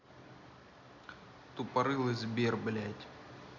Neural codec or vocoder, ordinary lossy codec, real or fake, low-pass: none; none; real; 7.2 kHz